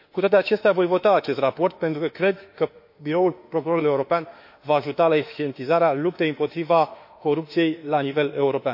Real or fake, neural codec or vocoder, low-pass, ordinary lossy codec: fake; autoencoder, 48 kHz, 32 numbers a frame, DAC-VAE, trained on Japanese speech; 5.4 kHz; MP3, 32 kbps